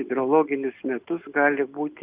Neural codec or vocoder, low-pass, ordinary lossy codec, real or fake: none; 3.6 kHz; Opus, 24 kbps; real